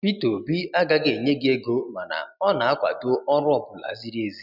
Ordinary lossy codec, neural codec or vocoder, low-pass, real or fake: none; none; 5.4 kHz; real